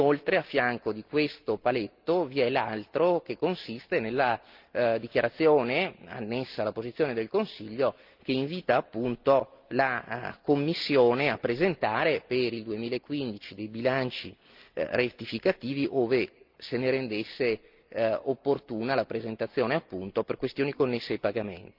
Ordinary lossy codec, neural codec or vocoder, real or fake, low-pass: Opus, 24 kbps; none; real; 5.4 kHz